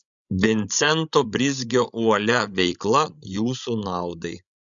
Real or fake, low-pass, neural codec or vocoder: fake; 7.2 kHz; codec, 16 kHz, 16 kbps, FreqCodec, larger model